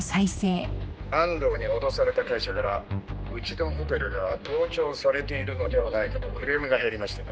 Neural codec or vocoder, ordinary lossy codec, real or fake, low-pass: codec, 16 kHz, 2 kbps, X-Codec, HuBERT features, trained on general audio; none; fake; none